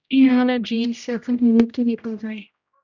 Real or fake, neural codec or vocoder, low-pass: fake; codec, 16 kHz, 0.5 kbps, X-Codec, HuBERT features, trained on general audio; 7.2 kHz